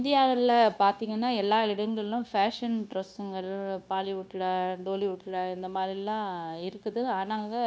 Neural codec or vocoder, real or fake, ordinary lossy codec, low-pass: codec, 16 kHz, 0.9 kbps, LongCat-Audio-Codec; fake; none; none